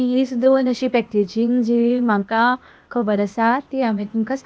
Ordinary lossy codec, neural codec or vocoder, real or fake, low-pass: none; codec, 16 kHz, 0.8 kbps, ZipCodec; fake; none